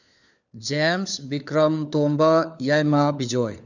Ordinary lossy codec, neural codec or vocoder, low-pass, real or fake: none; codec, 16 kHz, 4 kbps, FunCodec, trained on LibriTTS, 50 frames a second; 7.2 kHz; fake